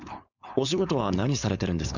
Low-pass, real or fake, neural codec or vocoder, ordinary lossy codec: 7.2 kHz; fake; codec, 16 kHz, 4.8 kbps, FACodec; none